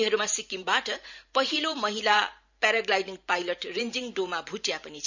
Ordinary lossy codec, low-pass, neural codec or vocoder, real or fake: none; 7.2 kHz; vocoder, 44.1 kHz, 128 mel bands every 512 samples, BigVGAN v2; fake